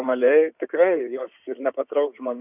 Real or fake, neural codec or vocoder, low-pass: fake; codec, 16 kHz in and 24 kHz out, 2.2 kbps, FireRedTTS-2 codec; 3.6 kHz